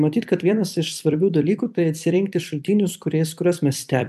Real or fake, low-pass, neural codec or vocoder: fake; 14.4 kHz; vocoder, 44.1 kHz, 128 mel bands every 256 samples, BigVGAN v2